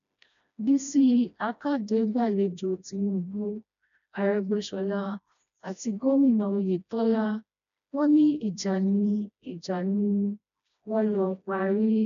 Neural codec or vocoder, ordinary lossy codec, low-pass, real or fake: codec, 16 kHz, 1 kbps, FreqCodec, smaller model; none; 7.2 kHz; fake